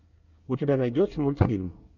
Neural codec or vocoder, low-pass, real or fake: codec, 24 kHz, 1 kbps, SNAC; 7.2 kHz; fake